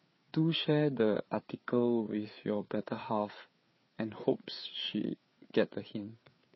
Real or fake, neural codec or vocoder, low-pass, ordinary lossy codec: fake; codec, 16 kHz, 4 kbps, FreqCodec, larger model; 7.2 kHz; MP3, 24 kbps